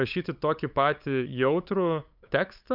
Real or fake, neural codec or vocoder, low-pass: fake; codec, 16 kHz, 8 kbps, FunCodec, trained on LibriTTS, 25 frames a second; 5.4 kHz